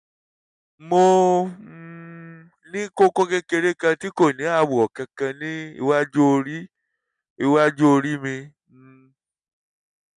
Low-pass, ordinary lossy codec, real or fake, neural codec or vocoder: 10.8 kHz; none; real; none